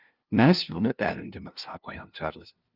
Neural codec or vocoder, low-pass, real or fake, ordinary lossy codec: codec, 16 kHz, 0.5 kbps, FunCodec, trained on LibriTTS, 25 frames a second; 5.4 kHz; fake; Opus, 32 kbps